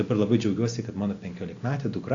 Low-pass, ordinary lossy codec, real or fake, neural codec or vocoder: 7.2 kHz; AAC, 48 kbps; real; none